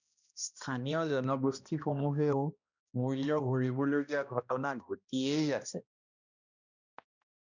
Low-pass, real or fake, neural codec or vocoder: 7.2 kHz; fake; codec, 16 kHz, 1 kbps, X-Codec, HuBERT features, trained on balanced general audio